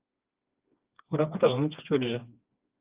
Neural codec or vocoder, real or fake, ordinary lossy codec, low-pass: codec, 16 kHz, 2 kbps, FreqCodec, smaller model; fake; Opus, 24 kbps; 3.6 kHz